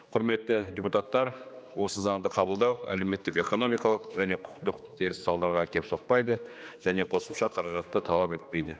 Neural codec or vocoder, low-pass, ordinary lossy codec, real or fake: codec, 16 kHz, 2 kbps, X-Codec, HuBERT features, trained on general audio; none; none; fake